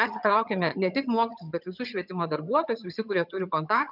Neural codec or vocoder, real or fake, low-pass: vocoder, 22.05 kHz, 80 mel bands, HiFi-GAN; fake; 5.4 kHz